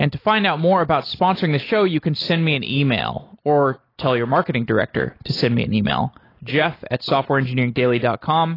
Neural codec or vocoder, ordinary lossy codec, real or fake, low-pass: none; AAC, 24 kbps; real; 5.4 kHz